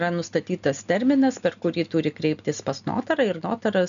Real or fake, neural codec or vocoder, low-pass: real; none; 7.2 kHz